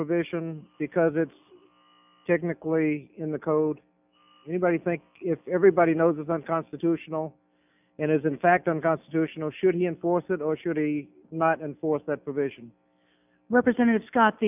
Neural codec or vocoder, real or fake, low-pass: none; real; 3.6 kHz